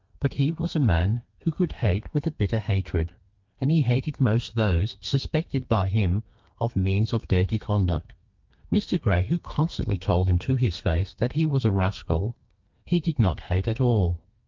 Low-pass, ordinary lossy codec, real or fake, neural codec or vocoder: 7.2 kHz; Opus, 24 kbps; fake; codec, 32 kHz, 1.9 kbps, SNAC